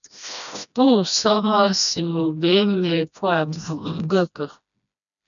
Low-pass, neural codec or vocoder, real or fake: 7.2 kHz; codec, 16 kHz, 1 kbps, FreqCodec, smaller model; fake